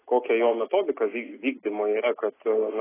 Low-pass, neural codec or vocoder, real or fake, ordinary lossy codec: 3.6 kHz; none; real; AAC, 16 kbps